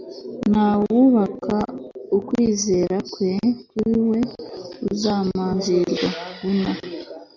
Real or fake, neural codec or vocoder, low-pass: real; none; 7.2 kHz